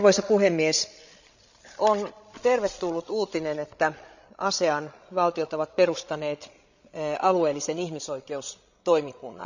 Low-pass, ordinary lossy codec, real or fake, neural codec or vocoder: 7.2 kHz; none; fake; codec, 16 kHz, 16 kbps, FreqCodec, larger model